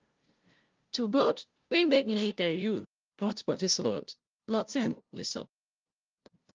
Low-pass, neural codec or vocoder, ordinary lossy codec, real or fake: 7.2 kHz; codec, 16 kHz, 0.5 kbps, FunCodec, trained on LibriTTS, 25 frames a second; Opus, 24 kbps; fake